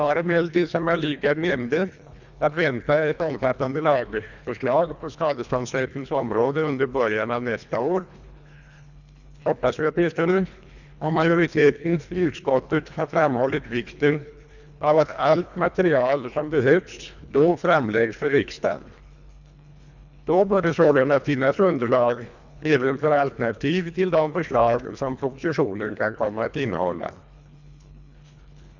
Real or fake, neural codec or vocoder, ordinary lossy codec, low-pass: fake; codec, 24 kHz, 1.5 kbps, HILCodec; none; 7.2 kHz